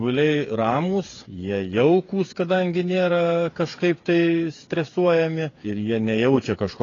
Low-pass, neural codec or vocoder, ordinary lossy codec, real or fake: 7.2 kHz; codec, 16 kHz, 8 kbps, FreqCodec, smaller model; AAC, 32 kbps; fake